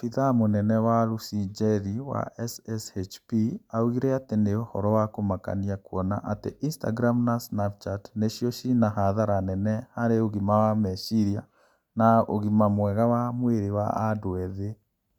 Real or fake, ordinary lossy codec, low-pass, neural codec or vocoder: real; none; 19.8 kHz; none